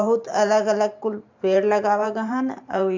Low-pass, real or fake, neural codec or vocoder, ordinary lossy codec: 7.2 kHz; fake; vocoder, 44.1 kHz, 128 mel bands every 512 samples, BigVGAN v2; MP3, 64 kbps